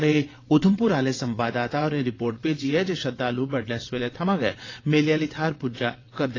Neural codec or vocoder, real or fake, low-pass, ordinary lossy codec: vocoder, 22.05 kHz, 80 mel bands, WaveNeXt; fake; 7.2 kHz; AAC, 32 kbps